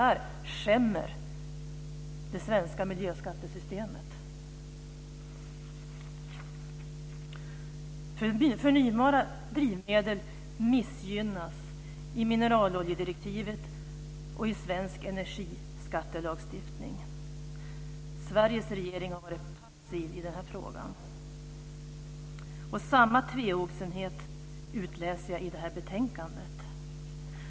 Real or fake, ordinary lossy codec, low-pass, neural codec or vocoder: real; none; none; none